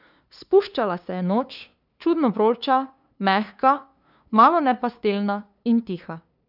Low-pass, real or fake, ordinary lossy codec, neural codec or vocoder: 5.4 kHz; fake; none; codec, 16 kHz, 2 kbps, FunCodec, trained on LibriTTS, 25 frames a second